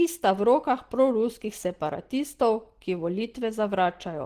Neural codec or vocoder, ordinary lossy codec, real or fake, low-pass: none; Opus, 16 kbps; real; 14.4 kHz